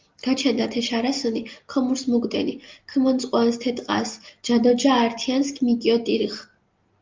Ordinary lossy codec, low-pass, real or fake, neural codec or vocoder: Opus, 32 kbps; 7.2 kHz; real; none